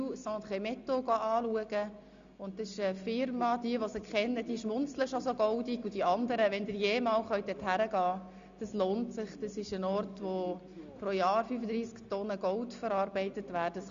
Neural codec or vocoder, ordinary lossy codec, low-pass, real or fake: none; Opus, 64 kbps; 7.2 kHz; real